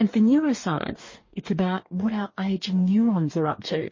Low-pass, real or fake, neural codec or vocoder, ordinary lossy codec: 7.2 kHz; fake; codec, 44.1 kHz, 2.6 kbps, DAC; MP3, 32 kbps